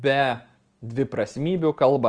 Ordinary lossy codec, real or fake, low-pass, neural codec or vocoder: Opus, 24 kbps; real; 9.9 kHz; none